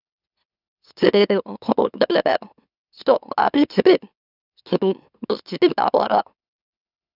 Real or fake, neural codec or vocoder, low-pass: fake; autoencoder, 44.1 kHz, a latent of 192 numbers a frame, MeloTTS; 5.4 kHz